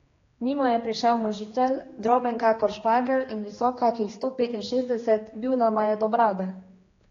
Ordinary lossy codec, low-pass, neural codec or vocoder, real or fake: AAC, 32 kbps; 7.2 kHz; codec, 16 kHz, 2 kbps, X-Codec, HuBERT features, trained on general audio; fake